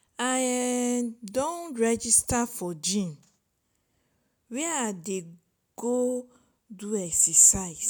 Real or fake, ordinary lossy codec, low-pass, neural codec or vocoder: real; none; none; none